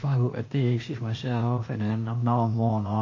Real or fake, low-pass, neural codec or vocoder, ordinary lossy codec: fake; 7.2 kHz; codec, 16 kHz, 0.8 kbps, ZipCodec; MP3, 32 kbps